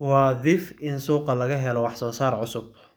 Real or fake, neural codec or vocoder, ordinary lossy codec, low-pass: fake; codec, 44.1 kHz, 7.8 kbps, DAC; none; none